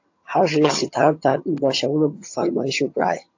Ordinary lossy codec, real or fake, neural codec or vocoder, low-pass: AAC, 48 kbps; fake; vocoder, 22.05 kHz, 80 mel bands, HiFi-GAN; 7.2 kHz